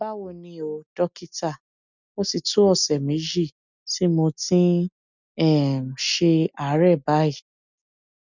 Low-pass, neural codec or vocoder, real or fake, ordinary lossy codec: 7.2 kHz; none; real; none